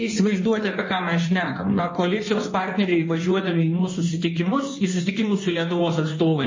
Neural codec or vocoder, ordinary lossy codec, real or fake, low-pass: codec, 16 kHz in and 24 kHz out, 1.1 kbps, FireRedTTS-2 codec; MP3, 32 kbps; fake; 7.2 kHz